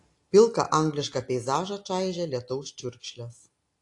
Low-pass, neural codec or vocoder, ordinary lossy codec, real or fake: 10.8 kHz; none; AAC, 48 kbps; real